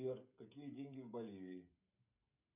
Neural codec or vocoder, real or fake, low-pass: codec, 16 kHz, 16 kbps, FreqCodec, smaller model; fake; 3.6 kHz